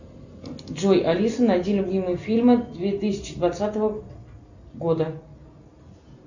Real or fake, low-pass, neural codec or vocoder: real; 7.2 kHz; none